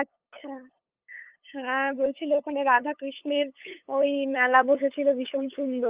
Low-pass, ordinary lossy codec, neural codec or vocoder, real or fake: 3.6 kHz; Opus, 24 kbps; codec, 16 kHz, 8 kbps, FunCodec, trained on LibriTTS, 25 frames a second; fake